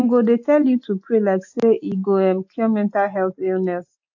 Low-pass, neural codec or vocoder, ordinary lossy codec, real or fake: 7.2 kHz; none; AAC, 48 kbps; real